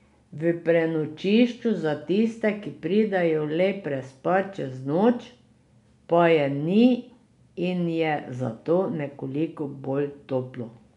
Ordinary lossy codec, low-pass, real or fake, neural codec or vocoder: MP3, 96 kbps; 10.8 kHz; real; none